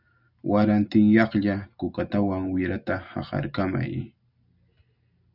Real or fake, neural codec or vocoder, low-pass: real; none; 5.4 kHz